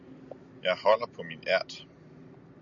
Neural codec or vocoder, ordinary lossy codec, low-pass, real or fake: none; MP3, 64 kbps; 7.2 kHz; real